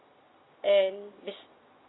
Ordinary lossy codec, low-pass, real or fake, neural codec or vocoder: AAC, 16 kbps; 7.2 kHz; real; none